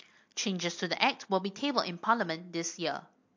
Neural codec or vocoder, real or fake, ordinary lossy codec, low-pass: none; real; MP3, 48 kbps; 7.2 kHz